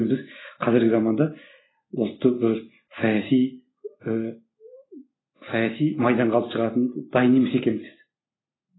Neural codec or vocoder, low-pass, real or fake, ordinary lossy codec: none; 7.2 kHz; real; AAC, 16 kbps